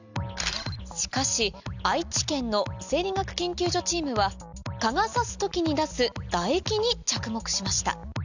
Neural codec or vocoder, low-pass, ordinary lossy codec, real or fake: none; 7.2 kHz; none; real